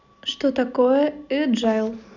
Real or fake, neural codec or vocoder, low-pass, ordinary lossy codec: real; none; 7.2 kHz; none